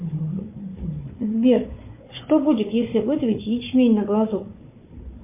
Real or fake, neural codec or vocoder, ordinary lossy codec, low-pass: fake; codec, 16 kHz, 4 kbps, FunCodec, trained on Chinese and English, 50 frames a second; MP3, 24 kbps; 3.6 kHz